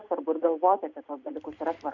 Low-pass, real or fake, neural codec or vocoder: 7.2 kHz; real; none